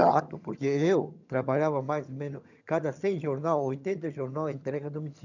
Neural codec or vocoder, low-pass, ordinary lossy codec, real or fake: vocoder, 22.05 kHz, 80 mel bands, HiFi-GAN; 7.2 kHz; none; fake